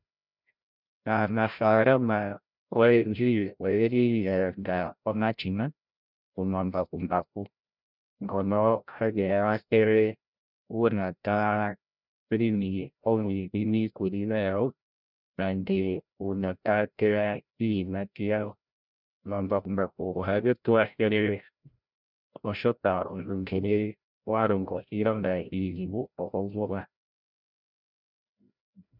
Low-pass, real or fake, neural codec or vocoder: 5.4 kHz; fake; codec, 16 kHz, 0.5 kbps, FreqCodec, larger model